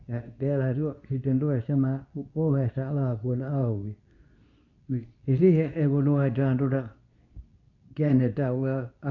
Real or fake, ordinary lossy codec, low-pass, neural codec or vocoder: fake; none; 7.2 kHz; codec, 24 kHz, 0.9 kbps, WavTokenizer, medium speech release version 2